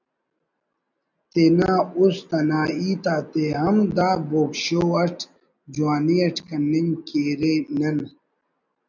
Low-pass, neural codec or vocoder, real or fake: 7.2 kHz; none; real